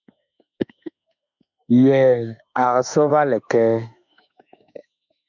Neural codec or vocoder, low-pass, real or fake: autoencoder, 48 kHz, 32 numbers a frame, DAC-VAE, trained on Japanese speech; 7.2 kHz; fake